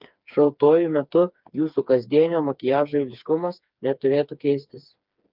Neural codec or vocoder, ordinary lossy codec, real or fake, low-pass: codec, 16 kHz, 4 kbps, FreqCodec, smaller model; Opus, 32 kbps; fake; 5.4 kHz